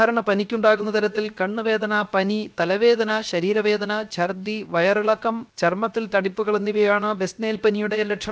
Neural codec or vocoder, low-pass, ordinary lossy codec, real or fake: codec, 16 kHz, 0.7 kbps, FocalCodec; none; none; fake